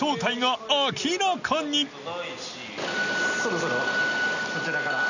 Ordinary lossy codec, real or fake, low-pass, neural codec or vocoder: none; real; 7.2 kHz; none